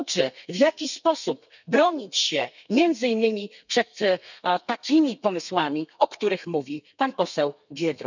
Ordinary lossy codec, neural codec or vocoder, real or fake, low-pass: none; codec, 32 kHz, 1.9 kbps, SNAC; fake; 7.2 kHz